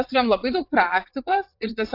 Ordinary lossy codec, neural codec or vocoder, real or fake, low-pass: AAC, 32 kbps; vocoder, 44.1 kHz, 80 mel bands, Vocos; fake; 5.4 kHz